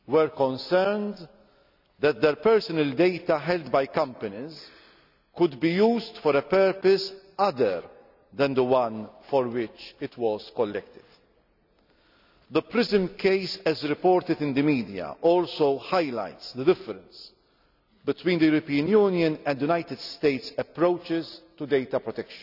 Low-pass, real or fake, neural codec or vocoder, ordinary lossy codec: 5.4 kHz; real; none; none